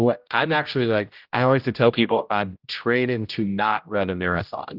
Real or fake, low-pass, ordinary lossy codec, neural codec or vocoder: fake; 5.4 kHz; Opus, 24 kbps; codec, 16 kHz, 0.5 kbps, X-Codec, HuBERT features, trained on general audio